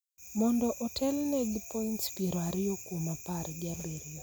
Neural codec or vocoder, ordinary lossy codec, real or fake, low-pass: none; none; real; none